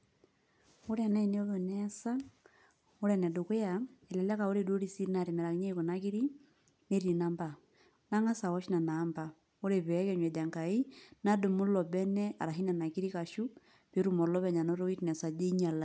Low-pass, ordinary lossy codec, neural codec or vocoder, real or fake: none; none; none; real